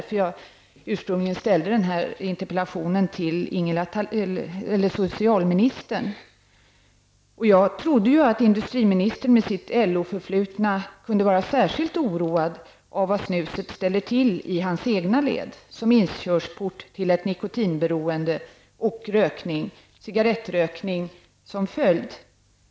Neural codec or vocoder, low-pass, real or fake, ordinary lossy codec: none; none; real; none